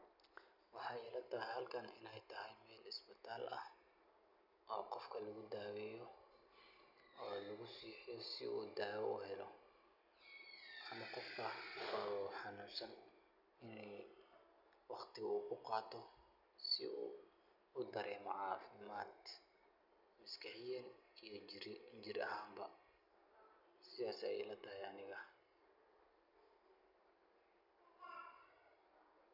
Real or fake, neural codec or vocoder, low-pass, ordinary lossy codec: real; none; 5.4 kHz; none